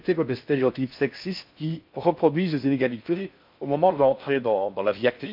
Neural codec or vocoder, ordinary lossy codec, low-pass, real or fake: codec, 16 kHz in and 24 kHz out, 0.6 kbps, FocalCodec, streaming, 4096 codes; MP3, 48 kbps; 5.4 kHz; fake